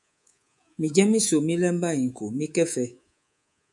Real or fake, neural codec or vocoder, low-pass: fake; codec, 24 kHz, 3.1 kbps, DualCodec; 10.8 kHz